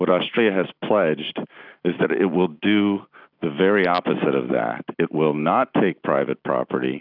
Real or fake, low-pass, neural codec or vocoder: real; 5.4 kHz; none